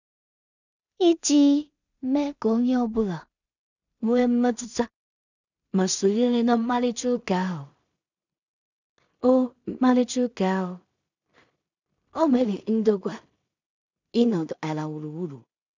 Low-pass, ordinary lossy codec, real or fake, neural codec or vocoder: 7.2 kHz; none; fake; codec, 16 kHz in and 24 kHz out, 0.4 kbps, LongCat-Audio-Codec, two codebook decoder